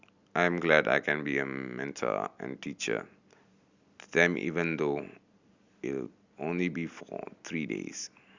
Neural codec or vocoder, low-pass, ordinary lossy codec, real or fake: none; 7.2 kHz; Opus, 64 kbps; real